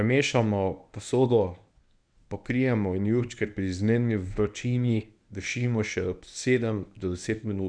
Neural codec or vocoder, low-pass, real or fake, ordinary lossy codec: codec, 24 kHz, 0.9 kbps, WavTokenizer, medium speech release version 1; 9.9 kHz; fake; none